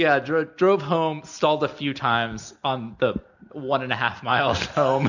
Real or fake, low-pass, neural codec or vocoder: real; 7.2 kHz; none